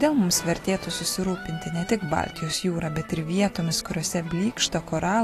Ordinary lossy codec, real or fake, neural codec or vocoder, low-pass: AAC, 48 kbps; real; none; 14.4 kHz